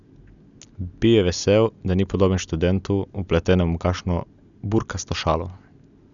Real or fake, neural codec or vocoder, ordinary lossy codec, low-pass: real; none; none; 7.2 kHz